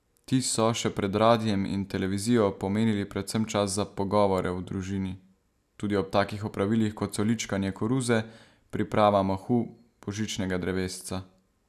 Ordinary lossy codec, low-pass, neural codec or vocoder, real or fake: none; 14.4 kHz; none; real